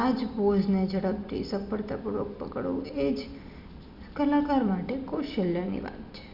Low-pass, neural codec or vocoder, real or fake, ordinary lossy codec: 5.4 kHz; none; real; none